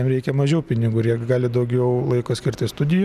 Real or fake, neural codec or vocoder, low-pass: real; none; 14.4 kHz